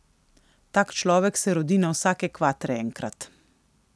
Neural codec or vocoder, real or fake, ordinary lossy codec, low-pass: none; real; none; none